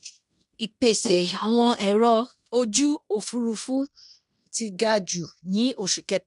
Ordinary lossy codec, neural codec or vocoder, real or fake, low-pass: none; codec, 16 kHz in and 24 kHz out, 0.9 kbps, LongCat-Audio-Codec, fine tuned four codebook decoder; fake; 10.8 kHz